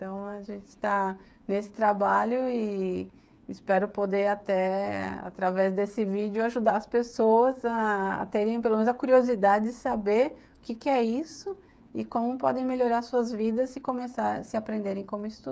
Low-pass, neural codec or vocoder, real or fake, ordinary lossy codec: none; codec, 16 kHz, 8 kbps, FreqCodec, smaller model; fake; none